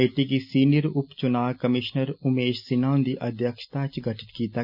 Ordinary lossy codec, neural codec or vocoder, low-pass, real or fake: none; none; 5.4 kHz; real